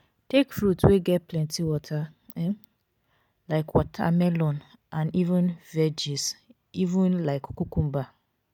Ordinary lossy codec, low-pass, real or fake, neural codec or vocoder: none; 19.8 kHz; real; none